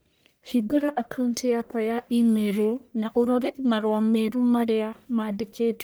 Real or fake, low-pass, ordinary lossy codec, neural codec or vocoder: fake; none; none; codec, 44.1 kHz, 1.7 kbps, Pupu-Codec